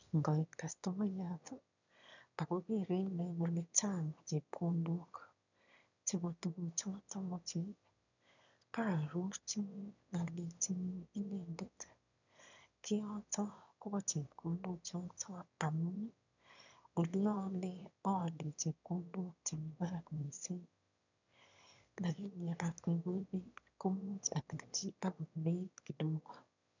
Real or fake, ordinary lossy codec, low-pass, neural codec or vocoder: fake; none; 7.2 kHz; autoencoder, 22.05 kHz, a latent of 192 numbers a frame, VITS, trained on one speaker